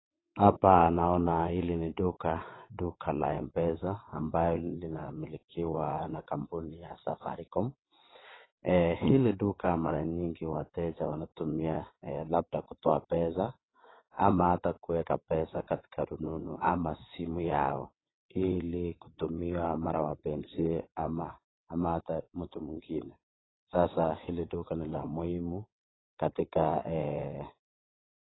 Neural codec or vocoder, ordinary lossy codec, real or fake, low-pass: vocoder, 22.05 kHz, 80 mel bands, WaveNeXt; AAC, 16 kbps; fake; 7.2 kHz